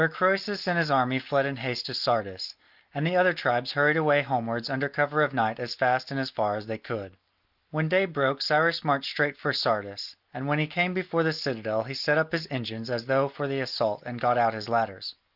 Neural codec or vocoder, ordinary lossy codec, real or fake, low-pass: none; Opus, 32 kbps; real; 5.4 kHz